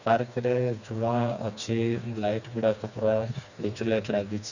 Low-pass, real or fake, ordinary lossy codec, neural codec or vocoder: 7.2 kHz; fake; none; codec, 16 kHz, 2 kbps, FreqCodec, smaller model